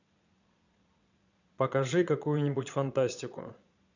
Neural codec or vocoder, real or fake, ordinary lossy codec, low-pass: vocoder, 22.05 kHz, 80 mel bands, Vocos; fake; none; 7.2 kHz